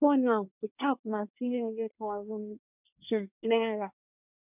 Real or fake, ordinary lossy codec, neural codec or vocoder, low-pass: fake; none; codec, 24 kHz, 1 kbps, SNAC; 3.6 kHz